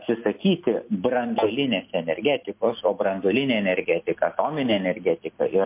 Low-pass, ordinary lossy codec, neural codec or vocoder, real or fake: 3.6 kHz; MP3, 32 kbps; none; real